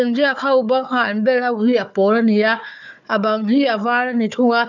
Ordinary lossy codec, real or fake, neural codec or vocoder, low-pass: none; fake; codec, 16 kHz, 4 kbps, FunCodec, trained on Chinese and English, 50 frames a second; 7.2 kHz